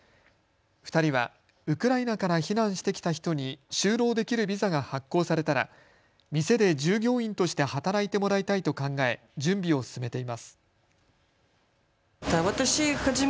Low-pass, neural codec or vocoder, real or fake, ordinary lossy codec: none; none; real; none